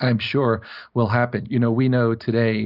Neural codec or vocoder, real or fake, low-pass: none; real; 5.4 kHz